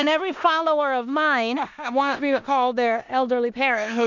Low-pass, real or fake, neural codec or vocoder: 7.2 kHz; fake; codec, 16 kHz in and 24 kHz out, 0.9 kbps, LongCat-Audio-Codec, four codebook decoder